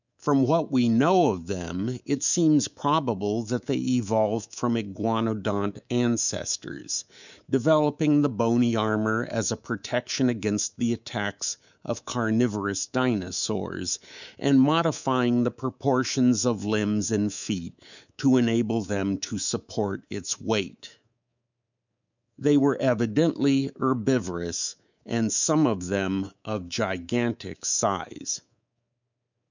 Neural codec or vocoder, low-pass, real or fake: codec, 24 kHz, 3.1 kbps, DualCodec; 7.2 kHz; fake